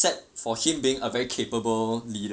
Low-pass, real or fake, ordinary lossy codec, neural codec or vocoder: none; real; none; none